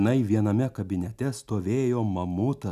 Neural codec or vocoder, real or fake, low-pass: none; real; 14.4 kHz